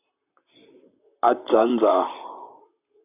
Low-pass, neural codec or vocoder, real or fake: 3.6 kHz; vocoder, 44.1 kHz, 128 mel bands, Pupu-Vocoder; fake